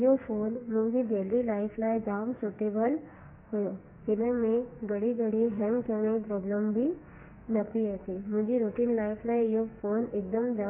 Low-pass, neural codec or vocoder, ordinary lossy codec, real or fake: 3.6 kHz; codec, 44.1 kHz, 2.6 kbps, SNAC; MP3, 24 kbps; fake